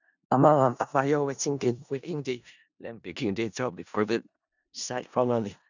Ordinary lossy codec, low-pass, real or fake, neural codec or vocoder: none; 7.2 kHz; fake; codec, 16 kHz in and 24 kHz out, 0.4 kbps, LongCat-Audio-Codec, four codebook decoder